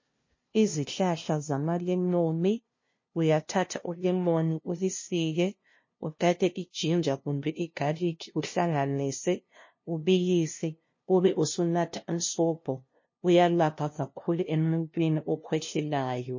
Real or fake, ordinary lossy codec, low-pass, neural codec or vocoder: fake; MP3, 32 kbps; 7.2 kHz; codec, 16 kHz, 0.5 kbps, FunCodec, trained on LibriTTS, 25 frames a second